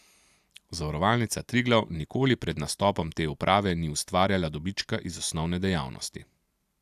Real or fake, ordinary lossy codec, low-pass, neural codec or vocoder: real; AAC, 96 kbps; 14.4 kHz; none